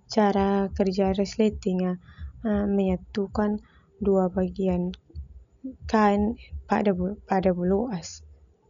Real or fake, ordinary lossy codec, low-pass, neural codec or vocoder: real; none; 7.2 kHz; none